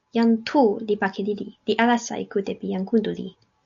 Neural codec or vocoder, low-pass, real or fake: none; 7.2 kHz; real